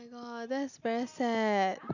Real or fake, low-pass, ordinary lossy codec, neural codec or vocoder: real; 7.2 kHz; none; none